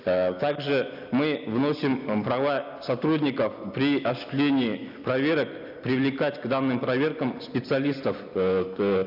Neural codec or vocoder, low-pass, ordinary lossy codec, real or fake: none; 5.4 kHz; none; real